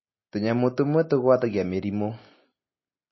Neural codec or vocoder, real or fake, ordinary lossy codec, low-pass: none; real; MP3, 24 kbps; 7.2 kHz